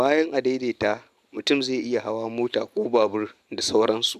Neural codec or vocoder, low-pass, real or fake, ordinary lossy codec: none; 14.4 kHz; real; AAC, 96 kbps